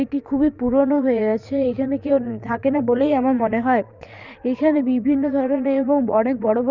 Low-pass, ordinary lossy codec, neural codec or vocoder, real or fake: 7.2 kHz; Opus, 64 kbps; vocoder, 22.05 kHz, 80 mel bands, Vocos; fake